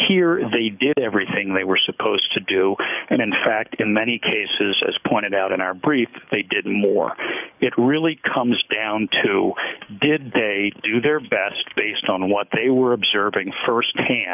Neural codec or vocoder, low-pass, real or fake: codec, 44.1 kHz, 7.8 kbps, DAC; 3.6 kHz; fake